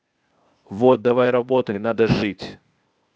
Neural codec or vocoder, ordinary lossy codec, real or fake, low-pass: codec, 16 kHz, 0.8 kbps, ZipCodec; none; fake; none